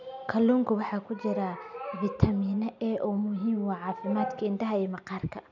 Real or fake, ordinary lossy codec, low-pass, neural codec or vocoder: real; none; 7.2 kHz; none